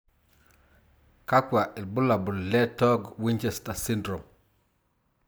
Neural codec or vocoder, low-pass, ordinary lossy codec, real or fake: none; none; none; real